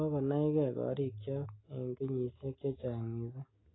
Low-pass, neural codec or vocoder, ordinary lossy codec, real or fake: 7.2 kHz; none; AAC, 16 kbps; real